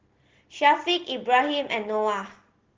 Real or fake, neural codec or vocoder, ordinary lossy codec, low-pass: real; none; Opus, 16 kbps; 7.2 kHz